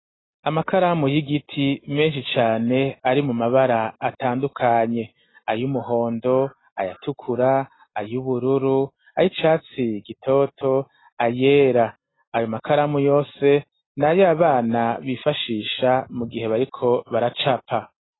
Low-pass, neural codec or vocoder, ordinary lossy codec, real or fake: 7.2 kHz; none; AAC, 16 kbps; real